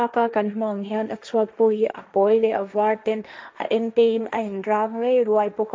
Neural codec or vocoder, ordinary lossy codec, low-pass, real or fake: codec, 16 kHz, 1.1 kbps, Voila-Tokenizer; none; none; fake